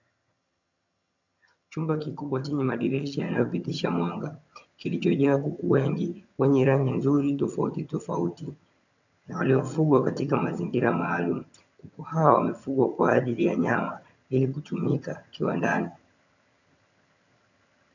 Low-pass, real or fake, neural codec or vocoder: 7.2 kHz; fake; vocoder, 22.05 kHz, 80 mel bands, HiFi-GAN